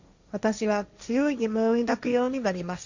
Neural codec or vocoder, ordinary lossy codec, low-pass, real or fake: codec, 16 kHz, 1.1 kbps, Voila-Tokenizer; Opus, 64 kbps; 7.2 kHz; fake